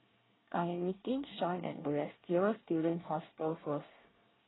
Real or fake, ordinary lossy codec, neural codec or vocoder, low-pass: fake; AAC, 16 kbps; codec, 24 kHz, 1 kbps, SNAC; 7.2 kHz